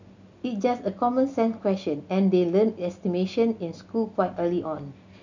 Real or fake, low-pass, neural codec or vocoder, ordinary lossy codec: real; 7.2 kHz; none; none